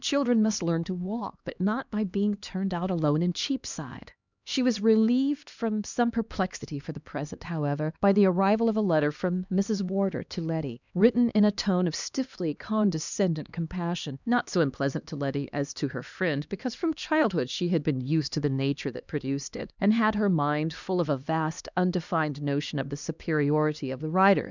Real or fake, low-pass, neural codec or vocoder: fake; 7.2 kHz; codec, 16 kHz, 2 kbps, X-Codec, HuBERT features, trained on LibriSpeech